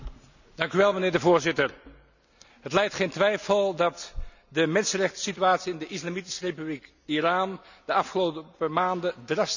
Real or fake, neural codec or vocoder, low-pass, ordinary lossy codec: real; none; 7.2 kHz; none